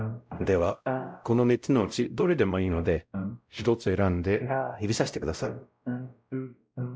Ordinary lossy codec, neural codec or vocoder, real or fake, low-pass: none; codec, 16 kHz, 0.5 kbps, X-Codec, WavLM features, trained on Multilingual LibriSpeech; fake; none